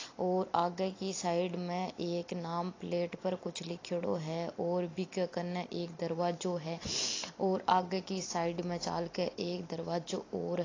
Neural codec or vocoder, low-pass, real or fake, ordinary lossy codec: none; 7.2 kHz; real; AAC, 32 kbps